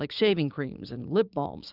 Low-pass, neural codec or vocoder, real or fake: 5.4 kHz; codec, 16 kHz, 6 kbps, DAC; fake